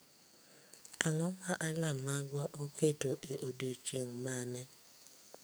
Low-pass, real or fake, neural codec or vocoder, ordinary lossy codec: none; fake; codec, 44.1 kHz, 2.6 kbps, SNAC; none